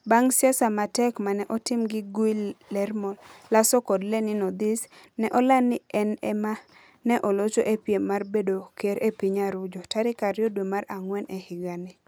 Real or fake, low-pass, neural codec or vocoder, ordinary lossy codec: real; none; none; none